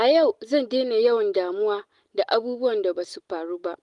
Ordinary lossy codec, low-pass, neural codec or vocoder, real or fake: Opus, 24 kbps; 10.8 kHz; none; real